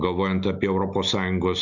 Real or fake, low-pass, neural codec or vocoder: real; 7.2 kHz; none